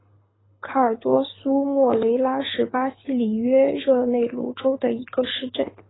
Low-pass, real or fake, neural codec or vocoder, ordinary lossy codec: 7.2 kHz; fake; codec, 24 kHz, 6 kbps, HILCodec; AAC, 16 kbps